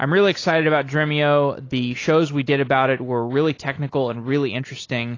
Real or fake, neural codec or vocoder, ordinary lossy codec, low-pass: real; none; AAC, 32 kbps; 7.2 kHz